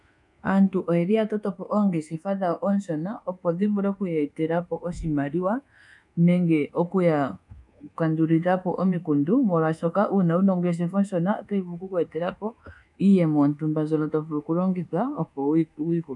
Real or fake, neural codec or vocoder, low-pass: fake; codec, 24 kHz, 1.2 kbps, DualCodec; 10.8 kHz